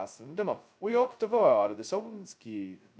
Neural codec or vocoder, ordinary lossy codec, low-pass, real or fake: codec, 16 kHz, 0.2 kbps, FocalCodec; none; none; fake